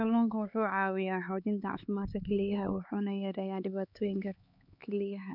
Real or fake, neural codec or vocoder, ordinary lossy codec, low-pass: fake; codec, 16 kHz, 4 kbps, X-Codec, HuBERT features, trained on LibriSpeech; none; 5.4 kHz